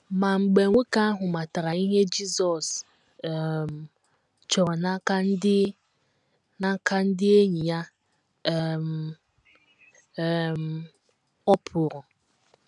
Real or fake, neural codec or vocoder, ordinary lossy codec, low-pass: real; none; none; 10.8 kHz